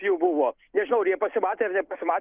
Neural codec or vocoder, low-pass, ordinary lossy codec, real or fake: none; 3.6 kHz; Opus, 24 kbps; real